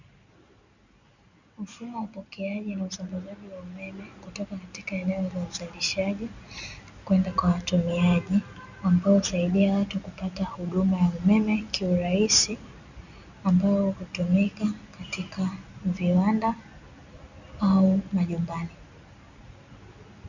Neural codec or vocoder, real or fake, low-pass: none; real; 7.2 kHz